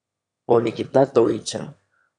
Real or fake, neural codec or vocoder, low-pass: fake; autoencoder, 22.05 kHz, a latent of 192 numbers a frame, VITS, trained on one speaker; 9.9 kHz